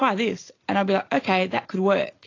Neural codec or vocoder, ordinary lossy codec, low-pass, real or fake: none; AAC, 32 kbps; 7.2 kHz; real